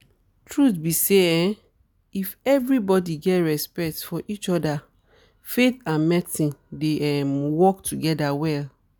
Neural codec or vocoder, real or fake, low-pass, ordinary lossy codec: none; real; none; none